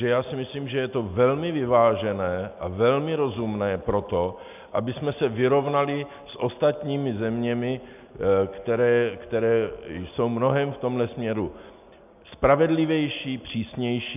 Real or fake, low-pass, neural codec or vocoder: real; 3.6 kHz; none